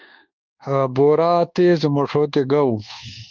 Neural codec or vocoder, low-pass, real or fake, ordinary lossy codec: codec, 24 kHz, 1.2 kbps, DualCodec; 7.2 kHz; fake; Opus, 32 kbps